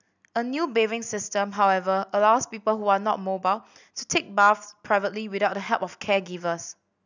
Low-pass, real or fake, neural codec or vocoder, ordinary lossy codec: 7.2 kHz; real; none; none